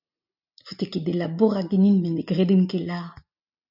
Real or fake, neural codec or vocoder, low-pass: real; none; 5.4 kHz